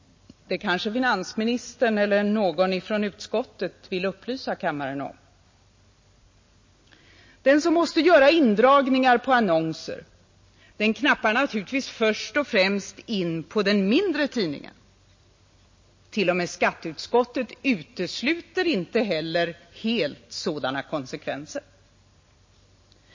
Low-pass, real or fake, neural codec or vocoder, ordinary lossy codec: 7.2 kHz; real; none; MP3, 32 kbps